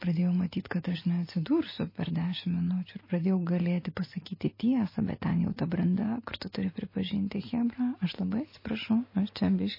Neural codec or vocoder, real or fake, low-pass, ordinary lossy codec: none; real; 5.4 kHz; MP3, 24 kbps